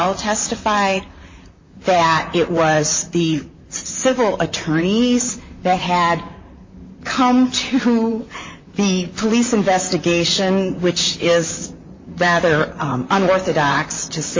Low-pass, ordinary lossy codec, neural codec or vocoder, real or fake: 7.2 kHz; MP3, 32 kbps; vocoder, 44.1 kHz, 128 mel bands, Pupu-Vocoder; fake